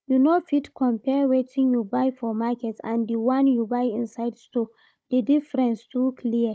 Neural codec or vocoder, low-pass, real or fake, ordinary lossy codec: codec, 16 kHz, 16 kbps, FunCodec, trained on Chinese and English, 50 frames a second; none; fake; none